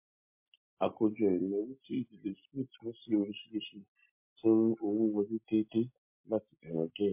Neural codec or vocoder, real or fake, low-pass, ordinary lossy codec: none; real; 3.6 kHz; MP3, 24 kbps